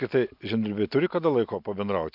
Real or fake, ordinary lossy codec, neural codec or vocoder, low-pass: real; MP3, 48 kbps; none; 5.4 kHz